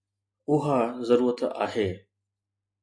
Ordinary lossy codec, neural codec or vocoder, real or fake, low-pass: MP3, 48 kbps; none; real; 9.9 kHz